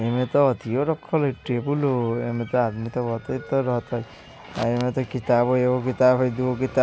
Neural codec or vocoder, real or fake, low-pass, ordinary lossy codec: none; real; none; none